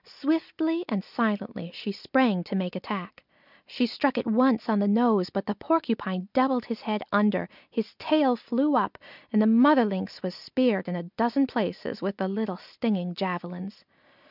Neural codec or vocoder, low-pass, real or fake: none; 5.4 kHz; real